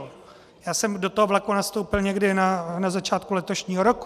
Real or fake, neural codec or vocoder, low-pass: real; none; 14.4 kHz